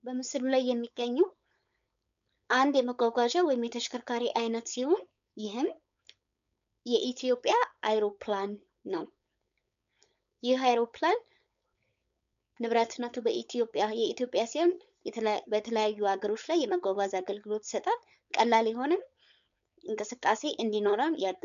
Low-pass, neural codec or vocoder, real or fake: 7.2 kHz; codec, 16 kHz, 4.8 kbps, FACodec; fake